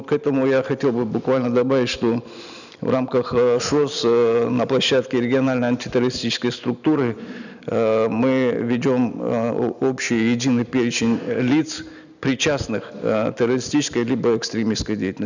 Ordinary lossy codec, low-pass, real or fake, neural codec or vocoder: none; 7.2 kHz; real; none